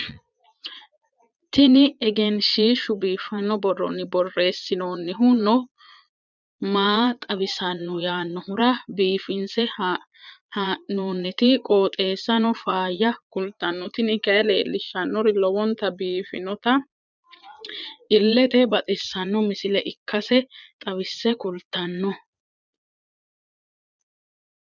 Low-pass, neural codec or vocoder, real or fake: 7.2 kHz; vocoder, 22.05 kHz, 80 mel bands, Vocos; fake